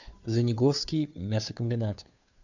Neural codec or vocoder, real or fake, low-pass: codec, 16 kHz, 2 kbps, FunCodec, trained on Chinese and English, 25 frames a second; fake; 7.2 kHz